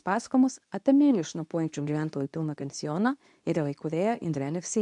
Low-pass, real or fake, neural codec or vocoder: 10.8 kHz; fake; codec, 24 kHz, 0.9 kbps, WavTokenizer, medium speech release version 2